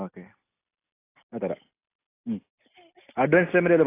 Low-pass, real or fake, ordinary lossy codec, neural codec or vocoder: 3.6 kHz; real; AAC, 24 kbps; none